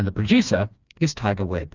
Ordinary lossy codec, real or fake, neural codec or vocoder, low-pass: Opus, 64 kbps; fake; codec, 16 kHz, 2 kbps, FreqCodec, smaller model; 7.2 kHz